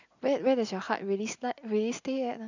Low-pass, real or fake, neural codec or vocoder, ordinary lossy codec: 7.2 kHz; real; none; none